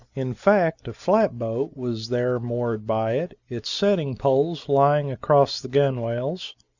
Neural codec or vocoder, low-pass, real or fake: none; 7.2 kHz; real